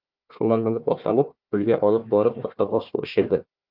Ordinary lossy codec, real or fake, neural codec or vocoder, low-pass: Opus, 24 kbps; fake; codec, 16 kHz, 1 kbps, FunCodec, trained on Chinese and English, 50 frames a second; 5.4 kHz